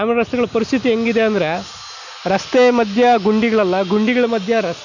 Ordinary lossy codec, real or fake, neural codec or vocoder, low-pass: none; real; none; 7.2 kHz